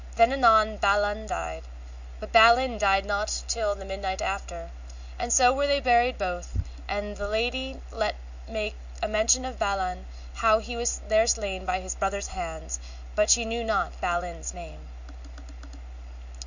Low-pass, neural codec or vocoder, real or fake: 7.2 kHz; none; real